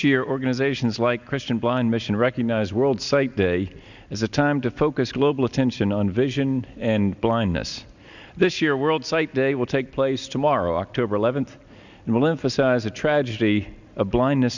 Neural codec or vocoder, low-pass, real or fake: none; 7.2 kHz; real